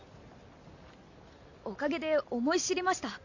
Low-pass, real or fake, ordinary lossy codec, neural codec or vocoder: 7.2 kHz; real; Opus, 64 kbps; none